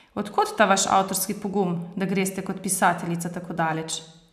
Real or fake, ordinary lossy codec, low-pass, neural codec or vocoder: real; none; 14.4 kHz; none